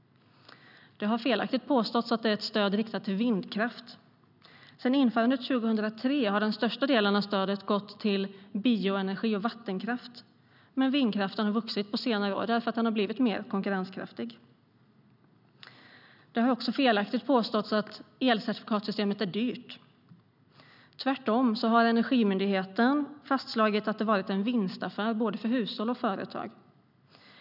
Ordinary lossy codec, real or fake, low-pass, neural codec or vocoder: none; real; 5.4 kHz; none